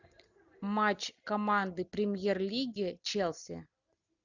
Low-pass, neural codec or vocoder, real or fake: 7.2 kHz; none; real